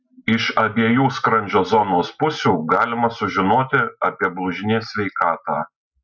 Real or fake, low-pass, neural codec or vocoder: real; 7.2 kHz; none